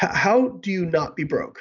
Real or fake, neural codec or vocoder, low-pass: real; none; 7.2 kHz